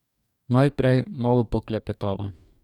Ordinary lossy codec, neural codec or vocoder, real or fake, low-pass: none; codec, 44.1 kHz, 2.6 kbps, DAC; fake; 19.8 kHz